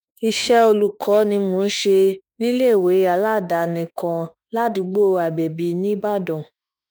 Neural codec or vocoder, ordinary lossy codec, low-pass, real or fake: autoencoder, 48 kHz, 32 numbers a frame, DAC-VAE, trained on Japanese speech; none; none; fake